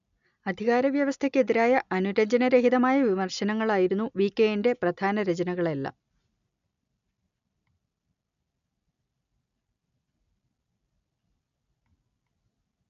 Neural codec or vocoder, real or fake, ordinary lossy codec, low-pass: none; real; none; 7.2 kHz